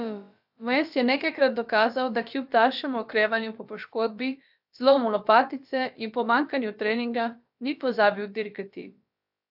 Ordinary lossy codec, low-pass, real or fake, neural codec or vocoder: AAC, 48 kbps; 5.4 kHz; fake; codec, 16 kHz, about 1 kbps, DyCAST, with the encoder's durations